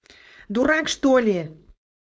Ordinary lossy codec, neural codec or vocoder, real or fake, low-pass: none; codec, 16 kHz, 4.8 kbps, FACodec; fake; none